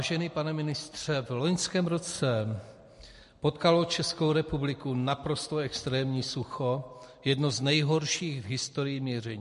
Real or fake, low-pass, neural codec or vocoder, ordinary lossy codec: real; 14.4 kHz; none; MP3, 48 kbps